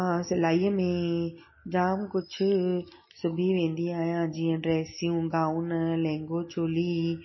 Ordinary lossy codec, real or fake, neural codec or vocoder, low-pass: MP3, 24 kbps; real; none; 7.2 kHz